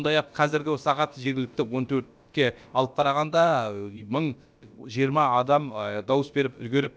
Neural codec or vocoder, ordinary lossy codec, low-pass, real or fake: codec, 16 kHz, about 1 kbps, DyCAST, with the encoder's durations; none; none; fake